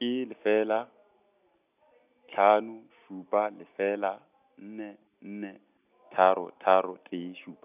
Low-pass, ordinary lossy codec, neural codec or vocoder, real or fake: 3.6 kHz; none; none; real